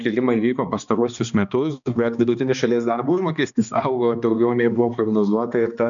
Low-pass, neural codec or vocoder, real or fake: 7.2 kHz; codec, 16 kHz, 2 kbps, X-Codec, HuBERT features, trained on balanced general audio; fake